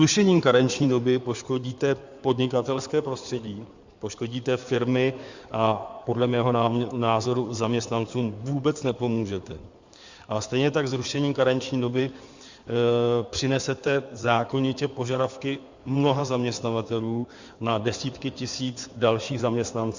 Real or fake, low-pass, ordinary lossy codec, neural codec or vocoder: fake; 7.2 kHz; Opus, 64 kbps; codec, 16 kHz in and 24 kHz out, 2.2 kbps, FireRedTTS-2 codec